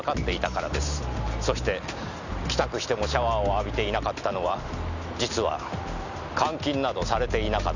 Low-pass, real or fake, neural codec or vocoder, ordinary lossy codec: 7.2 kHz; real; none; none